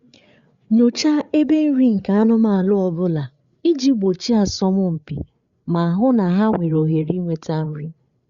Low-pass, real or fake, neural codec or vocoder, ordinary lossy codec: 7.2 kHz; fake; codec, 16 kHz, 8 kbps, FreqCodec, larger model; Opus, 64 kbps